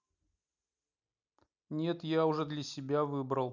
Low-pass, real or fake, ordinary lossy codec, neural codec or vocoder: 7.2 kHz; real; none; none